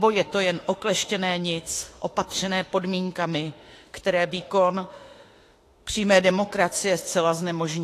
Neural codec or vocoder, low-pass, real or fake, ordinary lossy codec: autoencoder, 48 kHz, 32 numbers a frame, DAC-VAE, trained on Japanese speech; 14.4 kHz; fake; AAC, 48 kbps